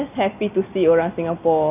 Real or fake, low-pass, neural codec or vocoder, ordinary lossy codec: real; 3.6 kHz; none; none